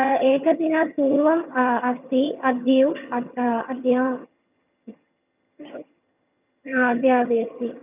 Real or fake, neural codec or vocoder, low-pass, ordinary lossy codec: fake; vocoder, 22.05 kHz, 80 mel bands, HiFi-GAN; 3.6 kHz; none